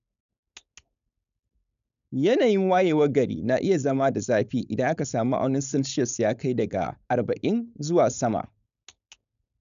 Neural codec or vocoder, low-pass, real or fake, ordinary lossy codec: codec, 16 kHz, 4.8 kbps, FACodec; 7.2 kHz; fake; none